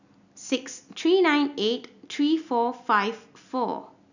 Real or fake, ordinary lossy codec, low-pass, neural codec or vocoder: real; none; 7.2 kHz; none